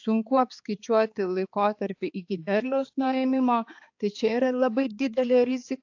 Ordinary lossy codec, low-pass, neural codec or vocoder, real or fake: AAC, 48 kbps; 7.2 kHz; codec, 16 kHz, 4 kbps, X-Codec, HuBERT features, trained on balanced general audio; fake